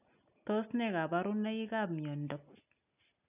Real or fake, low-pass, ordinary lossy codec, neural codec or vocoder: real; 3.6 kHz; none; none